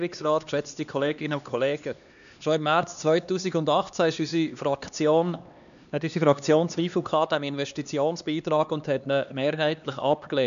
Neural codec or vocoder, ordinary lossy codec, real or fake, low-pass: codec, 16 kHz, 2 kbps, X-Codec, HuBERT features, trained on LibriSpeech; none; fake; 7.2 kHz